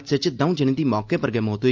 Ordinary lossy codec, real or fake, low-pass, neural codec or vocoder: Opus, 24 kbps; real; 7.2 kHz; none